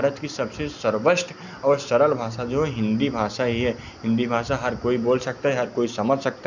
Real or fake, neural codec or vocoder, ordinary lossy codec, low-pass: real; none; none; 7.2 kHz